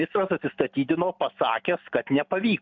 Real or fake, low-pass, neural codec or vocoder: real; 7.2 kHz; none